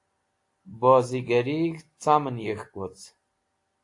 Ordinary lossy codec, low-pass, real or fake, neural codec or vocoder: AAC, 48 kbps; 10.8 kHz; real; none